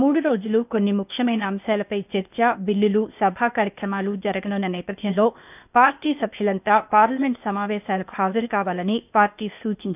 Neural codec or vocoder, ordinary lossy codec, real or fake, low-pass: codec, 16 kHz, 0.8 kbps, ZipCodec; none; fake; 3.6 kHz